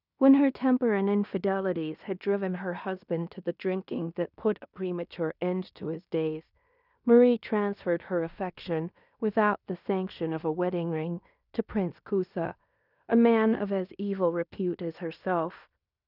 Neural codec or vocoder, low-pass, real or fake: codec, 16 kHz in and 24 kHz out, 0.9 kbps, LongCat-Audio-Codec, fine tuned four codebook decoder; 5.4 kHz; fake